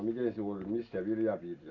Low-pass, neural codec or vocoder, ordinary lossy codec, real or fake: 7.2 kHz; none; none; real